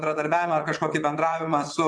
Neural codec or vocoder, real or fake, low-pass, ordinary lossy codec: vocoder, 22.05 kHz, 80 mel bands, WaveNeXt; fake; 9.9 kHz; MP3, 96 kbps